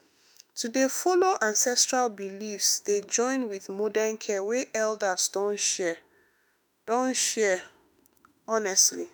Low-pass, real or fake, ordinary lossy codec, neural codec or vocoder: none; fake; none; autoencoder, 48 kHz, 32 numbers a frame, DAC-VAE, trained on Japanese speech